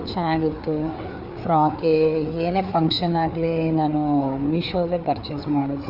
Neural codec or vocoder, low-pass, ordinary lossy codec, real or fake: codec, 16 kHz, 4 kbps, FreqCodec, larger model; 5.4 kHz; none; fake